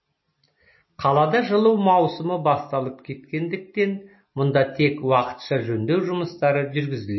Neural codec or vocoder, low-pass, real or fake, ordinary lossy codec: none; 7.2 kHz; real; MP3, 24 kbps